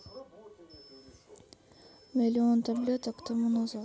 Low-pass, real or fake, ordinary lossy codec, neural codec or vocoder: none; real; none; none